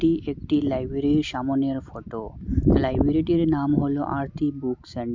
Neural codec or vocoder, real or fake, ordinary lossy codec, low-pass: none; real; MP3, 64 kbps; 7.2 kHz